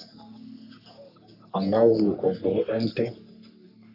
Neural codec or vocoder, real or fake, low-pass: codec, 44.1 kHz, 3.4 kbps, Pupu-Codec; fake; 5.4 kHz